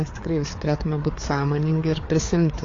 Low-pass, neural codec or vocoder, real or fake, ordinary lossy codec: 7.2 kHz; codec, 16 kHz, 4 kbps, FreqCodec, larger model; fake; AAC, 32 kbps